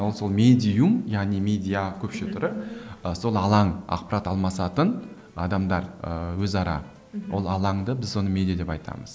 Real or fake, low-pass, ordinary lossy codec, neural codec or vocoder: real; none; none; none